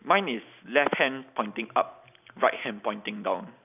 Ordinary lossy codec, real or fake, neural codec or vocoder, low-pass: none; real; none; 3.6 kHz